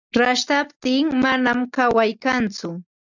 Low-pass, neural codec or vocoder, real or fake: 7.2 kHz; none; real